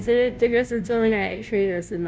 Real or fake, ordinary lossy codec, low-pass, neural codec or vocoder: fake; none; none; codec, 16 kHz, 0.5 kbps, FunCodec, trained on Chinese and English, 25 frames a second